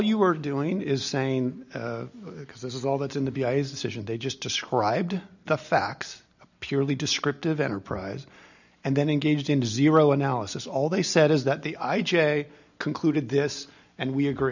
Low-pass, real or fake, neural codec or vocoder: 7.2 kHz; real; none